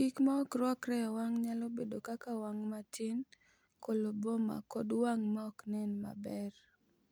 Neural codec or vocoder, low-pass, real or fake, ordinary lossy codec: none; none; real; none